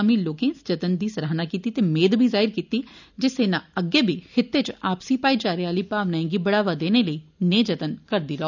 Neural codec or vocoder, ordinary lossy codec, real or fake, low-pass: none; none; real; 7.2 kHz